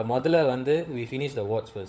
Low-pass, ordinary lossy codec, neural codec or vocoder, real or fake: none; none; codec, 16 kHz, 16 kbps, FunCodec, trained on LibriTTS, 50 frames a second; fake